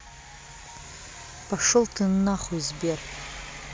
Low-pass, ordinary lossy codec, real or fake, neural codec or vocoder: none; none; real; none